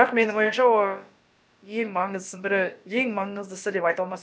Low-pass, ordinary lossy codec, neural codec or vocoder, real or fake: none; none; codec, 16 kHz, about 1 kbps, DyCAST, with the encoder's durations; fake